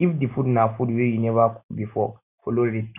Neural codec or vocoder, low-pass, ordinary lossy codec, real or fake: none; 3.6 kHz; none; real